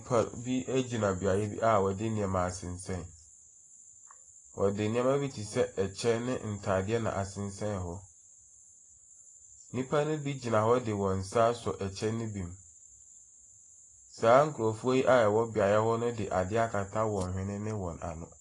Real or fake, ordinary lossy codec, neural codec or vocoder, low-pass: real; AAC, 32 kbps; none; 10.8 kHz